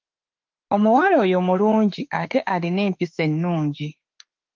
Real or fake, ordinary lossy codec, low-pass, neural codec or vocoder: fake; Opus, 32 kbps; 7.2 kHz; autoencoder, 48 kHz, 32 numbers a frame, DAC-VAE, trained on Japanese speech